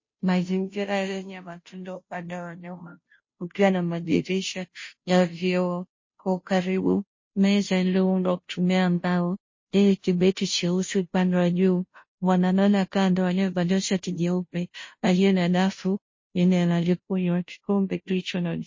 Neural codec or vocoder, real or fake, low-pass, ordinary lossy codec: codec, 16 kHz, 0.5 kbps, FunCodec, trained on Chinese and English, 25 frames a second; fake; 7.2 kHz; MP3, 32 kbps